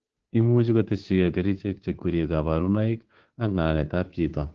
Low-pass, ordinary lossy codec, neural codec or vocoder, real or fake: 7.2 kHz; Opus, 16 kbps; codec, 16 kHz, 2 kbps, FunCodec, trained on Chinese and English, 25 frames a second; fake